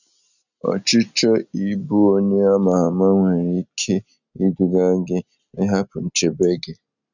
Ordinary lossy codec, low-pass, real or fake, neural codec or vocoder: none; 7.2 kHz; real; none